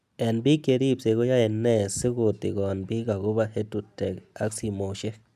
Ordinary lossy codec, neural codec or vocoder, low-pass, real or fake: none; none; 14.4 kHz; real